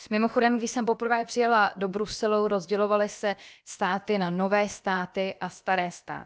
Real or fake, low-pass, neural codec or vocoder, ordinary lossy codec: fake; none; codec, 16 kHz, about 1 kbps, DyCAST, with the encoder's durations; none